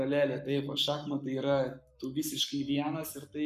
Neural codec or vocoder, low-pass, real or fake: codec, 44.1 kHz, 7.8 kbps, Pupu-Codec; 14.4 kHz; fake